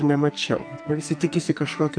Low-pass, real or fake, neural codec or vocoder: 9.9 kHz; fake; codec, 44.1 kHz, 2.6 kbps, SNAC